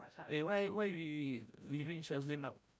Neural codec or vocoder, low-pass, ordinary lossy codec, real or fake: codec, 16 kHz, 0.5 kbps, FreqCodec, larger model; none; none; fake